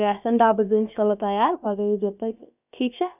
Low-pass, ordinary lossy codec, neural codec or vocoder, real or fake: 3.6 kHz; none; codec, 16 kHz, about 1 kbps, DyCAST, with the encoder's durations; fake